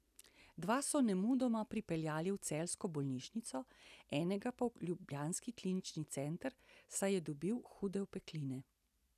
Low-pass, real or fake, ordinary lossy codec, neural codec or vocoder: 14.4 kHz; real; none; none